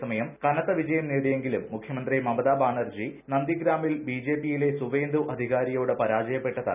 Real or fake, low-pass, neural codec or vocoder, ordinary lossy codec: real; 3.6 kHz; none; none